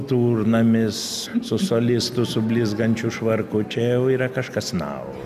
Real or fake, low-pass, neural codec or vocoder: real; 14.4 kHz; none